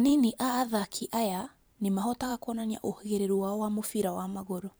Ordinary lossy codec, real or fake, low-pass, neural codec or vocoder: none; fake; none; vocoder, 44.1 kHz, 128 mel bands every 512 samples, BigVGAN v2